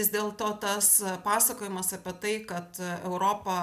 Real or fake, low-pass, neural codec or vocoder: real; 14.4 kHz; none